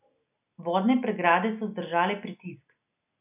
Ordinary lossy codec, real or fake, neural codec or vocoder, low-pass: none; real; none; 3.6 kHz